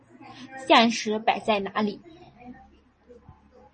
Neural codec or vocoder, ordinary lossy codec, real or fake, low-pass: none; MP3, 32 kbps; real; 10.8 kHz